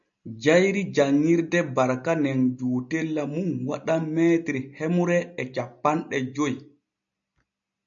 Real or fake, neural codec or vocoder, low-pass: real; none; 7.2 kHz